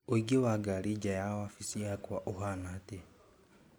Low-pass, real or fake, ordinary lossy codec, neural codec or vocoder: none; real; none; none